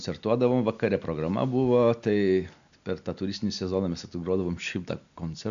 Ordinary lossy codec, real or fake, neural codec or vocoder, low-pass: AAC, 96 kbps; real; none; 7.2 kHz